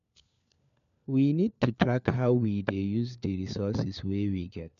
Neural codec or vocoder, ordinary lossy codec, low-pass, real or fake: codec, 16 kHz, 4 kbps, FunCodec, trained on LibriTTS, 50 frames a second; none; 7.2 kHz; fake